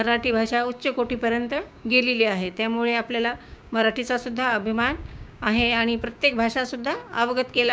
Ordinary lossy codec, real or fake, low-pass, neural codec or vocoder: none; fake; none; codec, 16 kHz, 6 kbps, DAC